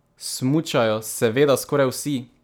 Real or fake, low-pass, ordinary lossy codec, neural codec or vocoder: real; none; none; none